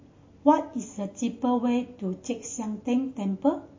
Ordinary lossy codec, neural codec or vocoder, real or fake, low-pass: MP3, 32 kbps; none; real; 7.2 kHz